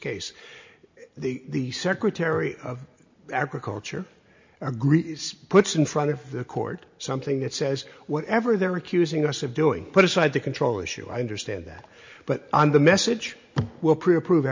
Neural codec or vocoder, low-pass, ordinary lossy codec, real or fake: none; 7.2 kHz; MP3, 64 kbps; real